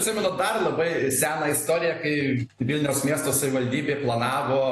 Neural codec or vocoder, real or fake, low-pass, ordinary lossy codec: none; real; 14.4 kHz; AAC, 48 kbps